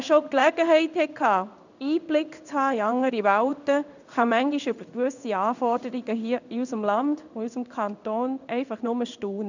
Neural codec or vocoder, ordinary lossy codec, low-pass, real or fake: codec, 16 kHz in and 24 kHz out, 1 kbps, XY-Tokenizer; none; 7.2 kHz; fake